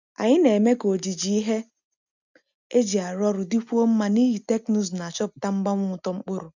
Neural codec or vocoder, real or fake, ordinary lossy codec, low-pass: none; real; none; 7.2 kHz